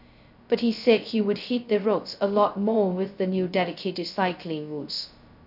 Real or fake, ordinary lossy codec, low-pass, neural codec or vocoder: fake; none; 5.4 kHz; codec, 16 kHz, 0.2 kbps, FocalCodec